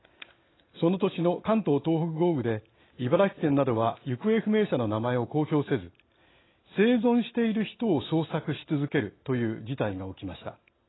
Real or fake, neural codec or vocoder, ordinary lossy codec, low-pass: real; none; AAC, 16 kbps; 7.2 kHz